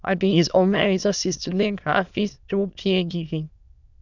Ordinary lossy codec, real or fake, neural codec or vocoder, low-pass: none; fake; autoencoder, 22.05 kHz, a latent of 192 numbers a frame, VITS, trained on many speakers; 7.2 kHz